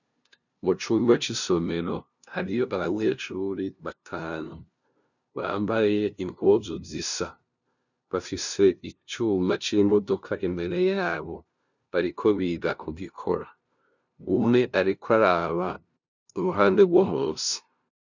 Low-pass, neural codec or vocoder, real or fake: 7.2 kHz; codec, 16 kHz, 0.5 kbps, FunCodec, trained on LibriTTS, 25 frames a second; fake